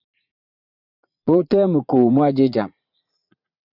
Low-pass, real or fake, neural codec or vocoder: 5.4 kHz; fake; vocoder, 44.1 kHz, 80 mel bands, Vocos